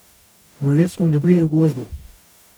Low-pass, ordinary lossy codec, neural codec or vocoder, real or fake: none; none; codec, 44.1 kHz, 0.9 kbps, DAC; fake